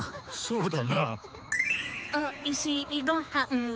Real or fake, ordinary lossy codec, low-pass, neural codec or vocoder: fake; none; none; codec, 16 kHz, 4 kbps, X-Codec, HuBERT features, trained on general audio